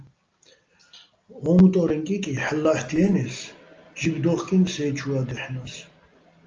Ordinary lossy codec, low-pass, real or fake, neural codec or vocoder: Opus, 24 kbps; 7.2 kHz; real; none